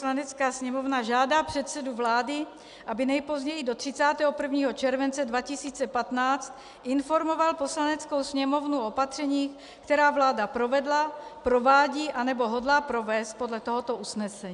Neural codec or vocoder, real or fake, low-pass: none; real; 10.8 kHz